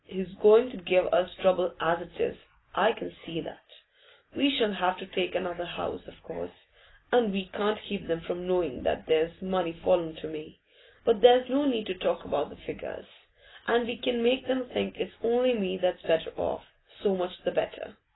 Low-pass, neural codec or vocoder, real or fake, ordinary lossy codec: 7.2 kHz; none; real; AAC, 16 kbps